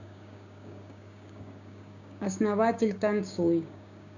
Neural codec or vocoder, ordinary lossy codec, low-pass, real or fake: codec, 16 kHz, 6 kbps, DAC; none; 7.2 kHz; fake